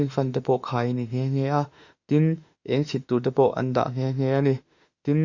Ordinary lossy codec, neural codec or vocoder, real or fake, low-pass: Opus, 64 kbps; autoencoder, 48 kHz, 32 numbers a frame, DAC-VAE, trained on Japanese speech; fake; 7.2 kHz